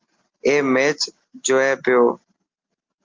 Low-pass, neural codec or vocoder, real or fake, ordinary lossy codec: 7.2 kHz; none; real; Opus, 24 kbps